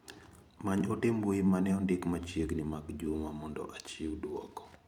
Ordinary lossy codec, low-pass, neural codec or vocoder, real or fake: none; 19.8 kHz; vocoder, 44.1 kHz, 128 mel bands every 512 samples, BigVGAN v2; fake